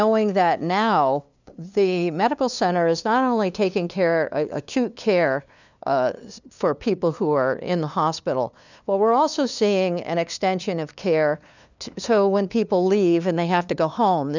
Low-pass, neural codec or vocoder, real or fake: 7.2 kHz; codec, 16 kHz, 2 kbps, FunCodec, trained on LibriTTS, 25 frames a second; fake